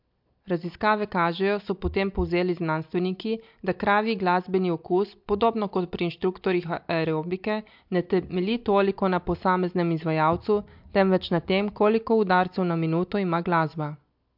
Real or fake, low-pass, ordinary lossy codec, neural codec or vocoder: real; 5.4 kHz; MP3, 48 kbps; none